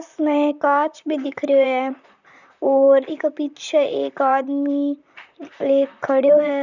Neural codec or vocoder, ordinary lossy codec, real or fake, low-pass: vocoder, 44.1 kHz, 128 mel bands, Pupu-Vocoder; none; fake; 7.2 kHz